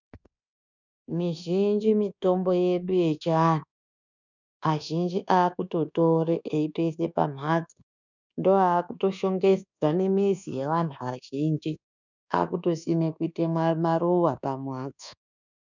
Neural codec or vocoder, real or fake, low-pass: codec, 24 kHz, 1.2 kbps, DualCodec; fake; 7.2 kHz